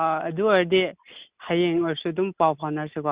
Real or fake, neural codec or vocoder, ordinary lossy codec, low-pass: real; none; Opus, 64 kbps; 3.6 kHz